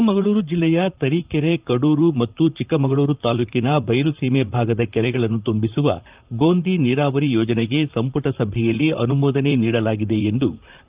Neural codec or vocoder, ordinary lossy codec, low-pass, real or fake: vocoder, 44.1 kHz, 128 mel bands, Pupu-Vocoder; Opus, 24 kbps; 3.6 kHz; fake